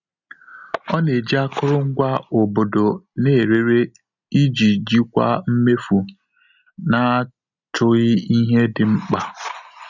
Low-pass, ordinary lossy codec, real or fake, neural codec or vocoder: 7.2 kHz; none; real; none